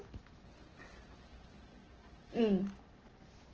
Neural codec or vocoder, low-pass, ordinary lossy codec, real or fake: none; 7.2 kHz; Opus, 16 kbps; real